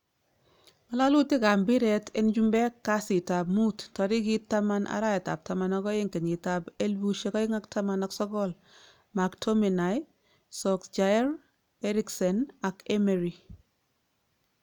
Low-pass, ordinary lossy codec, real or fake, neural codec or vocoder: 19.8 kHz; none; real; none